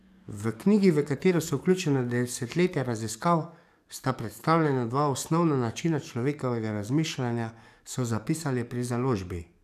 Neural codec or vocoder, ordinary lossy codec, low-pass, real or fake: codec, 44.1 kHz, 7.8 kbps, DAC; none; 14.4 kHz; fake